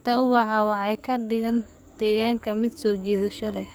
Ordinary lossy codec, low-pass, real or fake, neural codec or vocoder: none; none; fake; codec, 44.1 kHz, 2.6 kbps, SNAC